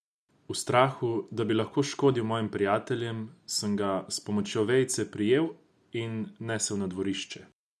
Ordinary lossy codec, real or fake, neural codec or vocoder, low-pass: none; real; none; none